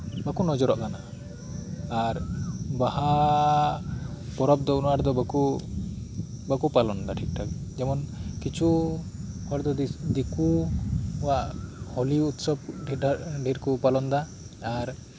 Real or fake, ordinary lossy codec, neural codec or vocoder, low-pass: real; none; none; none